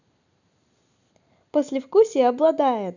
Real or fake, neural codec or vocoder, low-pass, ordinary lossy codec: real; none; 7.2 kHz; none